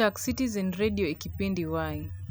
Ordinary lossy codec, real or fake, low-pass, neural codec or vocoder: none; real; none; none